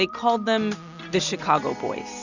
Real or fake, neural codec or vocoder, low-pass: real; none; 7.2 kHz